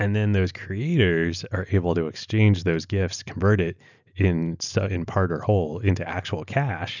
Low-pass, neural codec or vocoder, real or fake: 7.2 kHz; none; real